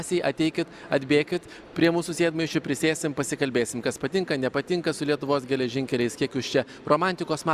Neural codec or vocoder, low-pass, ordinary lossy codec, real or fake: none; 14.4 kHz; Opus, 64 kbps; real